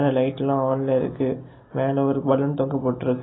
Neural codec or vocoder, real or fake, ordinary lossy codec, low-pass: none; real; AAC, 16 kbps; 7.2 kHz